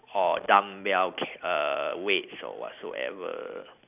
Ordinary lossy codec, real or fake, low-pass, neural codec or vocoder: none; real; 3.6 kHz; none